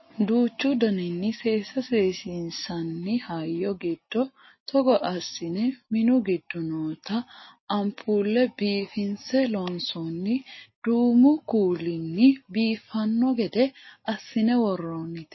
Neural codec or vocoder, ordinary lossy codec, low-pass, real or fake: none; MP3, 24 kbps; 7.2 kHz; real